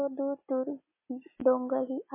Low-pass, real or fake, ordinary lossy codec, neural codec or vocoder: 3.6 kHz; real; MP3, 16 kbps; none